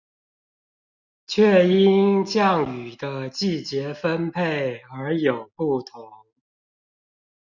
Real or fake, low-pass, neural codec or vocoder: real; 7.2 kHz; none